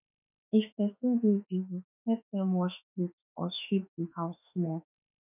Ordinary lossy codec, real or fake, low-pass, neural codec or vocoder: none; fake; 3.6 kHz; autoencoder, 48 kHz, 32 numbers a frame, DAC-VAE, trained on Japanese speech